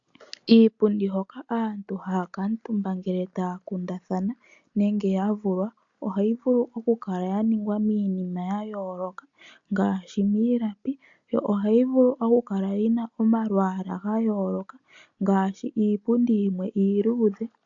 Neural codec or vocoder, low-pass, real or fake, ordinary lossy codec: none; 7.2 kHz; real; AAC, 64 kbps